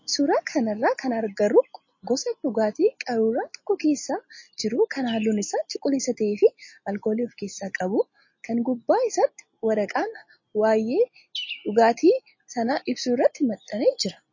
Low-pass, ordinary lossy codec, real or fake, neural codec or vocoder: 7.2 kHz; MP3, 32 kbps; real; none